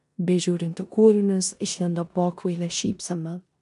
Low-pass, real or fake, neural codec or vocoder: 10.8 kHz; fake; codec, 16 kHz in and 24 kHz out, 0.9 kbps, LongCat-Audio-Codec, four codebook decoder